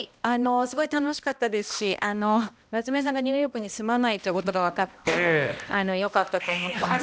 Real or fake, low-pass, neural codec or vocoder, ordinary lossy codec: fake; none; codec, 16 kHz, 1 kbps, X-Codec, HuBERT features, trained on balanced general audio; none